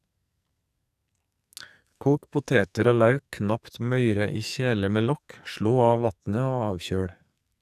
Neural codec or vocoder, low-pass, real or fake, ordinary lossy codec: codec, 44.1 kHz, 2.6 kbps, SNAC; 14.4 kHz; fake; none